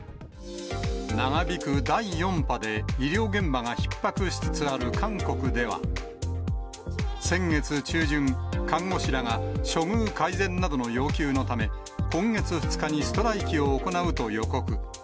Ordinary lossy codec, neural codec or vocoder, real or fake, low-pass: none; none; real; none